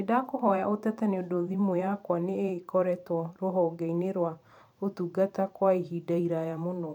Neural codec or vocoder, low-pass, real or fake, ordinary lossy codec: vocoder, 44.1 kHz, 128 mel bands every 512 samples, BigVGAN v2; 19.8 kHz; fake; none